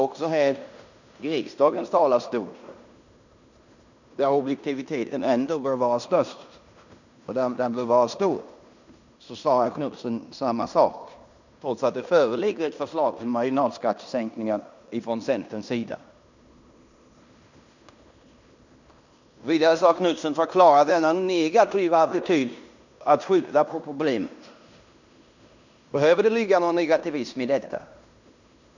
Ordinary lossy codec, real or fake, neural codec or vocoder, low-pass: none; fake; codec, 16 kHz in and 24 kHz out, 0.9 kbps, LongCat-Audio-Codec, fine tuned four codebook decoder; 7.2 kHz